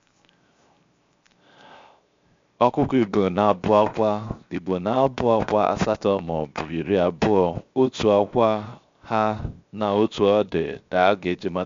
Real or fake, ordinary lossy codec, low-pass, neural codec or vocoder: fake; MP3, 64 kbps; 7.2 kHz; codec, 16 kHz, 0.7 kbps, FocalCodec